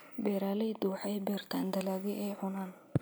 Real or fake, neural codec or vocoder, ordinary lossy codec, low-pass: real; none; none; none